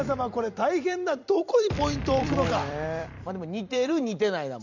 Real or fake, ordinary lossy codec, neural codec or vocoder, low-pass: fake; none; vocoder, 44.1 kHz, 128 mel bands every 256 samples, BigVGAN v2; 7.2 kHz